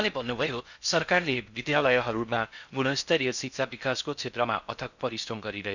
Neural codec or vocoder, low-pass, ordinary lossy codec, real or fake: codec, 16 kHz in and 24 kHz out, 0.6 kbps, FocalCodec, streaming, 4096 codes; 7.2 kHz; none; fake